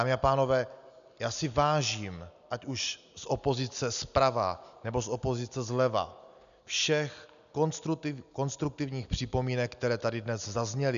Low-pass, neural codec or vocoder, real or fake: 7.2 kHz; none; real